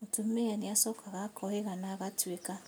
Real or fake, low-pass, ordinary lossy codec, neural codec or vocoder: real; none; none; none